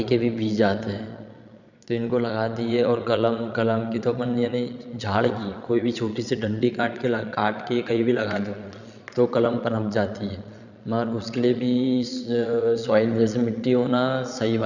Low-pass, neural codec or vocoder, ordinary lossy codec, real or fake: 7.2 kHz; vocoder, 22.05 kHz, 80 mel bands, Vocos; none; fake